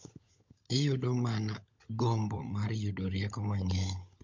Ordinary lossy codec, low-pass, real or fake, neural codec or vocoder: MP3, 48 kbps; 7.2 kHz; fake; codec, 16 kHz, 16 kbps, FunCodec, trained on LibriTTS, 50 frames a second